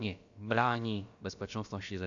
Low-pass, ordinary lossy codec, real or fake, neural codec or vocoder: 7.2 kHz; AAC, 64 kbps; fake; codec, 16 kHz, about 1 kbps, DyCAST, with the encoder's durations